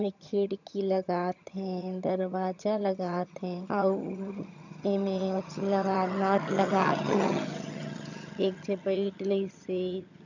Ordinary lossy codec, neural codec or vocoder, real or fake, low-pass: none; vocoder, 22.05 kHz, 80 mel bands, HiFi-GAN; fake; 7.2 kHz